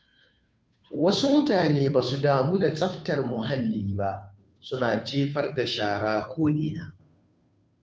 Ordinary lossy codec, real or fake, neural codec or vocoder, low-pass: none; fake; codec, 16 kHz, 2 kbps, FunCodec, trained on Chinese and English, 25 frames a second; none